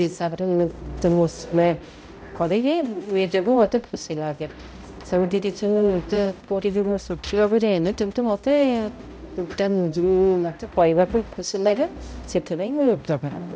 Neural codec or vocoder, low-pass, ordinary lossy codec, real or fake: codec, 16 kHz, 0.5 kbps, X-Codec, HuBERT features, trained on balanced general audio; none; none; fake